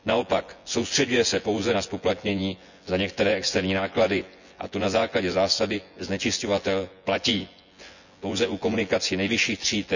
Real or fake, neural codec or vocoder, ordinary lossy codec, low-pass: fake; vocoder, 24 kHz, 100 mel bands, Vocos; none; 7.2 kHz